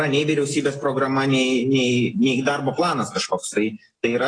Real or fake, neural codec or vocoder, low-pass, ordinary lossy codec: real; none; 9.9 kHz; AAC, 32 kbps